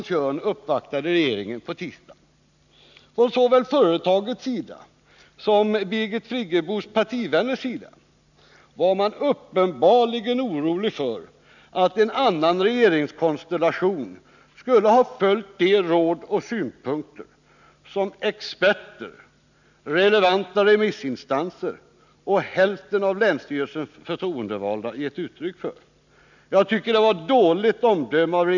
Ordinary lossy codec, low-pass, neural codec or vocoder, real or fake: none; 7.2 kHz; none; real